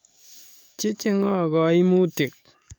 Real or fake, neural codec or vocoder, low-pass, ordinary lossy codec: fake; codec, 44.1 kHz, 7.8 kbps, DAC; 19.8 kHz; none